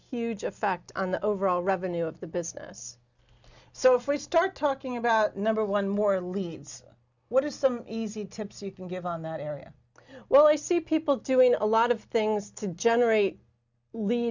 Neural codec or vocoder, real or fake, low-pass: none; real; 7.2 kHz